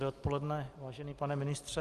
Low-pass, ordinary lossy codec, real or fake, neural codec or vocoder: 14.4 kHz; Opus, 24 kbps; real; none